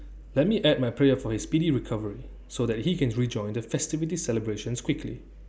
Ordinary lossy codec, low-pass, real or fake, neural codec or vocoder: none; none; real; none